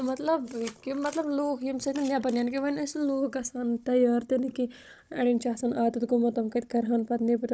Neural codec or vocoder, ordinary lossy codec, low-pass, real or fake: codec, 16 kHz, 16 kbps, FunCodec, trained on Chinese and English, 50 frames a second; none; none; fake